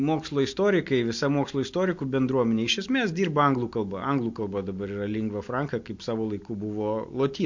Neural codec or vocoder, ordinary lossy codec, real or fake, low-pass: none; MP3, 48 kbps; real; 7.2 kHz